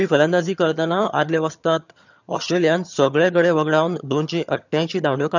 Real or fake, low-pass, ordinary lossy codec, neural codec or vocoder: fake; 7.2 kHz; none; vocoder, 22.05 kHz, 80 mel bands, HiFi-GAN